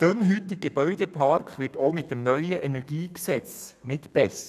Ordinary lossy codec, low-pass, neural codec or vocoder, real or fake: none; 14.4 kHz; codec, 32 kHz, 1.9 kbps, SNAC; fake